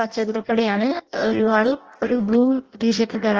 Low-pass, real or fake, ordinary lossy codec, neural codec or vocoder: 7.2 kHz; fake; Opus, 16 kbps; codec, 16 kHz in and 24 kHz out, 0.6 kbps, FireRedTTS-2 codec